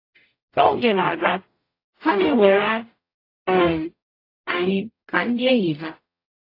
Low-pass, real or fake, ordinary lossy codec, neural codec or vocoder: 5.4 kHz; fake; AAC, 32 kbps; codec, 44.1 kHz, 0.9 kbps, DAC